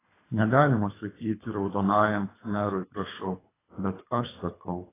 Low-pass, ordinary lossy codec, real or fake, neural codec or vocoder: 3.6 kHz; AAC, 16 kbps; fake; codec, 24 kHz, 3 kbps, HILCodec